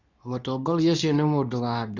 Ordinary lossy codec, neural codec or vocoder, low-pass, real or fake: none; codec, 24 kHz, 0.9 kbps, WavTokenizer, medium speech release version 2; 7.2 kHz; fake